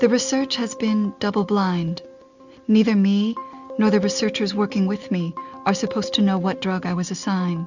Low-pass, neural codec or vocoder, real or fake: 7.2 kHz; none; real